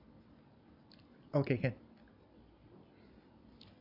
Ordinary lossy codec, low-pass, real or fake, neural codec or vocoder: none; 5.4 kHz; real; none